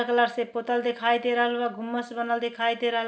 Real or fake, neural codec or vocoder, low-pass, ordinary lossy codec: real; none; none; none